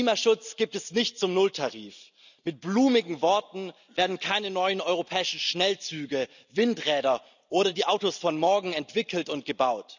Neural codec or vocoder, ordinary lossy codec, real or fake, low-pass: none; none; real; 7.2 kHz